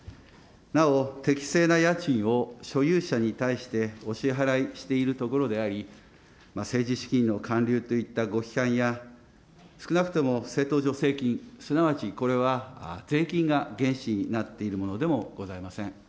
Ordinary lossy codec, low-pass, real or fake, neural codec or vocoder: none; none; real; none